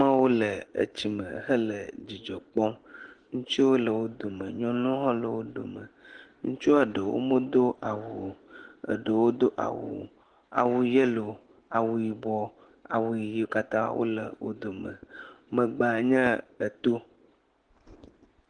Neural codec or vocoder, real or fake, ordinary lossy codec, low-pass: none; real; Opus, 16 kbps; 9.9 kHz